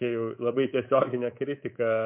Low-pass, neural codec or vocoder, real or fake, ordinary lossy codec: 3.6 kHz; codec, 24 kHz, 3.1 kbps, DualCodec; fake; MP3, 32 kbps